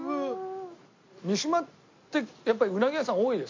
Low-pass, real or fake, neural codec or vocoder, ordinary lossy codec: 7.2 kHz; real; none; MP3, 48 kbps